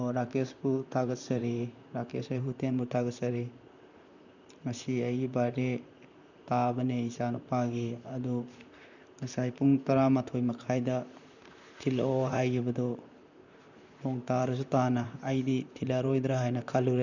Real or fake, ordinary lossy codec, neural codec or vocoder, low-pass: fake; none; vocoder, 44.1 kHz, 128 mel bands, Pupu-Vocoder; 7.2 kHz